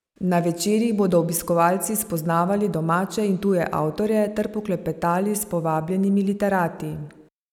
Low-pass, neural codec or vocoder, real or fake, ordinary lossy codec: 19.8 kHz; none; real; none